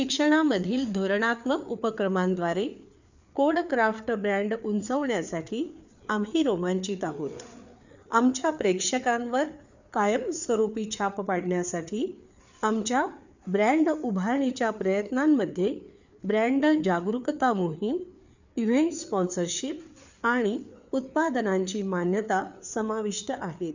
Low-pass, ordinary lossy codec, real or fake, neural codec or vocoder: 7.2 kHz; none; fake; codec, 16 kHz, 4 kbps, FreqCodec, larger model